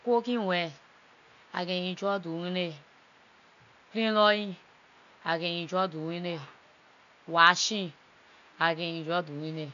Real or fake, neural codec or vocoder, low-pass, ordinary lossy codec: real; none; 7.2 kHz; none